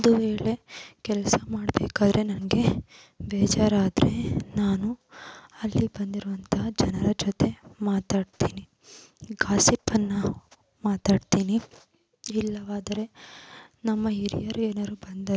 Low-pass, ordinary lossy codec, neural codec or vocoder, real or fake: none; none; none; real